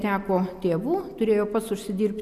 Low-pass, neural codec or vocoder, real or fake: 14.4 kHz; none; real